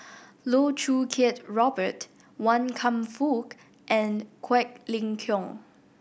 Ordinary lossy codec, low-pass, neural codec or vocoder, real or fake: none; none; none; real